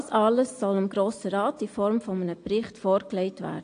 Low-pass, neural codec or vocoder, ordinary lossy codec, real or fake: 9.9 kHz; none; AAC, 48 kbps; real